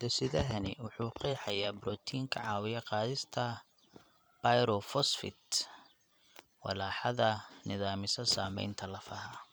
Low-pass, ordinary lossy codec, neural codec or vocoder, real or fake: none; none; vocoder, 44.1 kHz, 128 mel bands every 512 samples, BigVGAN v2; fake